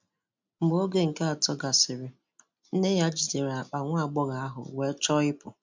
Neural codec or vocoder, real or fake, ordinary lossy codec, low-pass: none; real; MP3, 64 kbps; 7.2 kHz